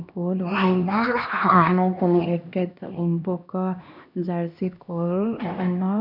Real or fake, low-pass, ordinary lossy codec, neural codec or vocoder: fake; 5.4 kHz; none; codec, 16 kHz, 1 kbps, X-Codec, HuBERT features, trained on LibriSpeech